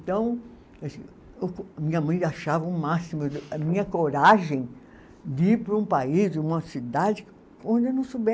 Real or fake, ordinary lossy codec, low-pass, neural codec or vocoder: real; none; none; none